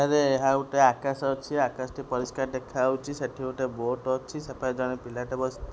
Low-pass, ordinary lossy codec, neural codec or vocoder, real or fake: none; none; none; real